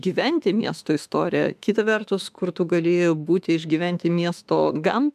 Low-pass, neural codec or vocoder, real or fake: 14.4 kHz; autoencoder, 48 kHz, 32 numbers a frame, DAC-VAE, trained on Japanese speech; fake